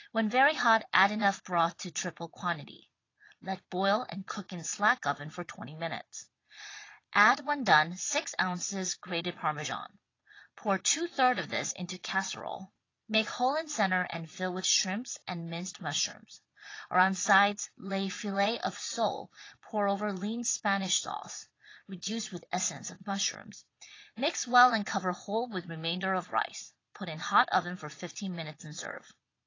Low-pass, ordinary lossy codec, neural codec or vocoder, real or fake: 7.2 kHz; AAC, 32 kbps; vocoder, 22.05 kHz, 80 mel bands, Vocos; fake